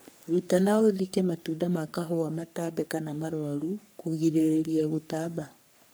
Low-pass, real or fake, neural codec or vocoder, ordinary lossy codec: none; fake; codec, 44.1 kHz, 3.4 kbps, Pupu-Codec; none